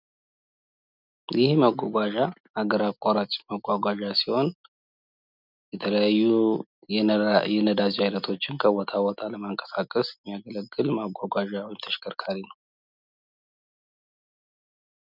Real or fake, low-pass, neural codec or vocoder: real; 5.4 kHz; none